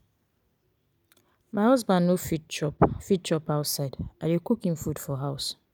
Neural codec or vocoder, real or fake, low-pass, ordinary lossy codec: none; real; none; none